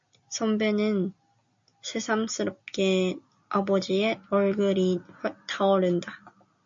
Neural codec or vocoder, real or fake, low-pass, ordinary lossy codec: none; real; 7.2 kHz; MP3, 48 kbps